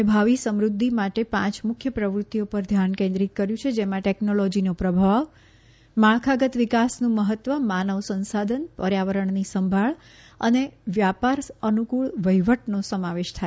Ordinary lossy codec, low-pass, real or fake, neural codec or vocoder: none; none; real; none